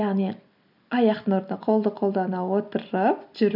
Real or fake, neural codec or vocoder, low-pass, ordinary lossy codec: real; none; 5.4 kHz; none